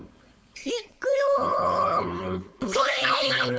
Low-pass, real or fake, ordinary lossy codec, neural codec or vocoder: none; fake; none; codec, 16 kHz, 4.8 kbps, FACodec